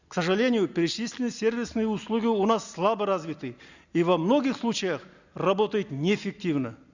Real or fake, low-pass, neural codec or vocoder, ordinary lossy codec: real; 7.2 kHz; none; Opus, 64 kbps